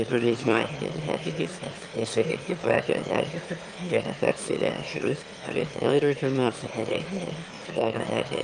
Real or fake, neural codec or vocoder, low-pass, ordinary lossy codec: fake; autoencoder, 22.05 kHz, a latent of 192 numbers a frame, VITS, trained on one speaker; 9.9 kHz; Opus, 32 kbps